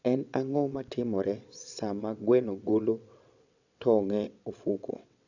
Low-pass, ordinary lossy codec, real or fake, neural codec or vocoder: 7.2 kHz; none; fake; vocoder, 22.05 kHz, 80 mel bands, WaveNeXt